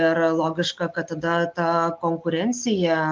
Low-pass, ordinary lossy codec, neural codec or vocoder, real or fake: 7.2 kHz; Opus, 32 kbps; none; real